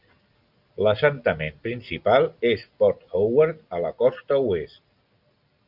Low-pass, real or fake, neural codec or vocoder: 5.4 kHz; real; none